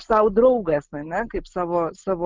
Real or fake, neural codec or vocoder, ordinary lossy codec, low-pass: real; none; Opus, 32 kbps; 7.2 kHz